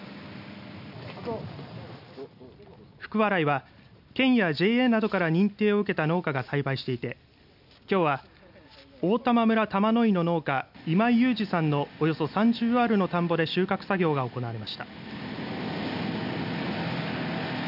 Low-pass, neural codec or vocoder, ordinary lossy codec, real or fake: 5.4 kHz; none; none; real